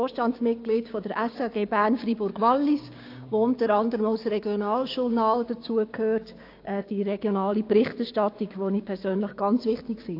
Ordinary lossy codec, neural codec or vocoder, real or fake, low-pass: AAC, 32 kbps; codec, 16 kHz, 6 kbps, DAC; fake; 5.4 kHz